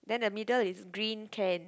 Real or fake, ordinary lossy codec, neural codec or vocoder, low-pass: real; none; none; none